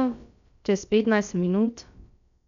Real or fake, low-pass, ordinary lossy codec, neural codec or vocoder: fake; 7.2 kHz; none; codec, 16 kHz, about 1 kbps, DyCAST, with the encoder's durations